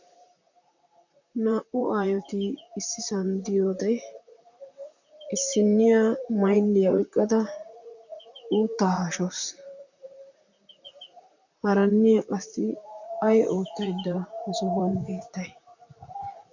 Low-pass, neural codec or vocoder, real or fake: 7.2 kHz; vocoder, 44.1 kHz, 128 mel bands, Pupu-Vocoder; fake